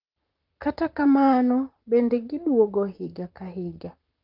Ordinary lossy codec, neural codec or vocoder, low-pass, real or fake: Opus, 32 kbps; vocoder, 44.1 kHz, 128 mel bands, Pupu-Vocoder; 5.4 kHz; fake